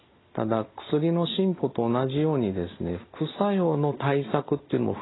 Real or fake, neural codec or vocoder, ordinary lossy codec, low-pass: real; none; AAC, 16 kbps; 7.2 kHz